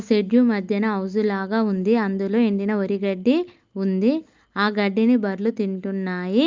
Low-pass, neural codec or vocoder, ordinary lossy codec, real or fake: 7.2 kHz; none; Opus, 24 kbps; real